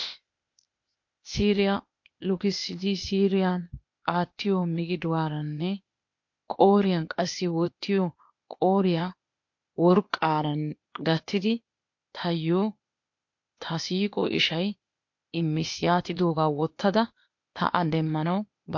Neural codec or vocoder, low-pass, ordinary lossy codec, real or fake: codec, 16 kHz, 0.8 kbps, ZipCodec; 7.2 kHz; MP3, 48 kbps; fake